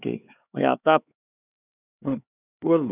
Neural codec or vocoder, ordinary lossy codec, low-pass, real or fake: codec, 16 kHz, 4 kbps, X-Codec, HuBERT features, trained on LibriSpeech; none; 3.6 kHz; fake